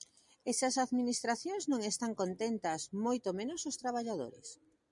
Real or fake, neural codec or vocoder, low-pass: real; none; 10.8 kHz